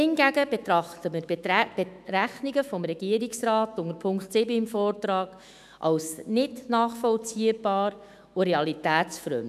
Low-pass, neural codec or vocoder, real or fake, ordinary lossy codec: 14.4 kHz; autoencoder, 48 kHz, 128 numbers a frame, DAC-VAE, trained on Japanese speech; fake; none